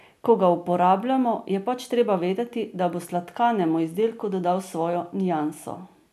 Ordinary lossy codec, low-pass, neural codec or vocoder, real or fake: none; 14.4 kHz; none; real